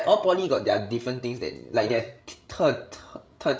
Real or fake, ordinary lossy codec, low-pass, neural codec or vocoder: fake; none; none; codec, 16 kHz, 16 kbps, FreqCodec, larger model